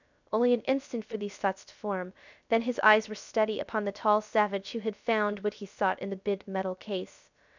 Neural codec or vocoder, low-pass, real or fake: codec, 16 kHz, 0.3 kbps, FocalCodec; 7.2 kHz; fake